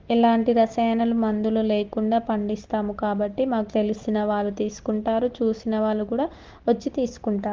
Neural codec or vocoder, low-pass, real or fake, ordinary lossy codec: none; 7.2 kHz; real; Opus, 16 kbps